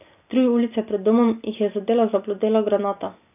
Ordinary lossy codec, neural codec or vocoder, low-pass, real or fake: none; none; 3.6 kHz; real